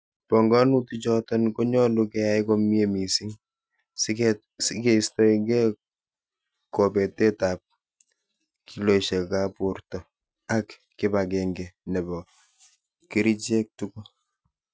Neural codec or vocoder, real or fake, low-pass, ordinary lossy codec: none; real; none; none